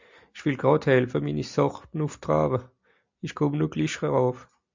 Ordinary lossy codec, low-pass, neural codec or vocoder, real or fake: MP3, 64 kbps; 7.2 kHz; none; real